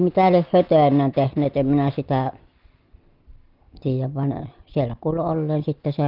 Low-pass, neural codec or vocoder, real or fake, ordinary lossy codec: 5.4 kHz; none; real; Opus, 32 kbps